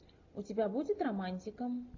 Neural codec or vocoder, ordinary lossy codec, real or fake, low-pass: none; AAC, 48 kbps; real; 7.2 kHz